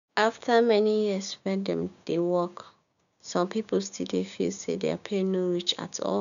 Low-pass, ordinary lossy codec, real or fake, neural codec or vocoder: 7.2 kHz; none; fake; codec, 16 kHz, 6 kbps, DAC